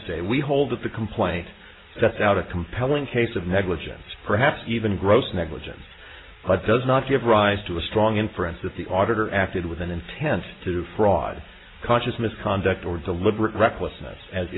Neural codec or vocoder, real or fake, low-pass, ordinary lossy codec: none; real; 7.2 kHz; AAC, 16 kbps